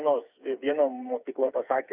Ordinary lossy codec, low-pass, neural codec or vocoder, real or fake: Opus, 64 kbps; 3.6 kHz; codec, 16 kHz, 4 kbps, FreqCodec, smaller model; fake